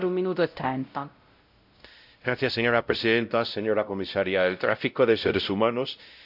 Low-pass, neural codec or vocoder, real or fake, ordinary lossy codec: 5.4 kHz; codec, 16 kHz, 0.5 kbps, X-Codec, WavLM features, trained on Multilingual LibriSpeech; fake; AAC, 48 kbps